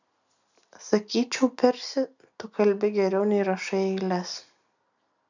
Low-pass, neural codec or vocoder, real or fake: 7.2 kHz; none; real